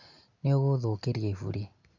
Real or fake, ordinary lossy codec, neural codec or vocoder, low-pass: real; none; none; 7.2 kHz